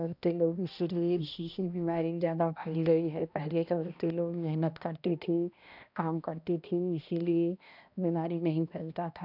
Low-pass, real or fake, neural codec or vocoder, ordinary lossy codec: 5.4 kHz; fake; codec, 16 kHz, 1 kbps, X-Codec, HuBERT features, trained on balanced general audio; none